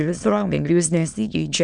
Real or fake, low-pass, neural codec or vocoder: fake; 9.9 kHz; autoencoder, 22.05 kHz, a latent of 192 numbers a frame, VITS, trained on many speakers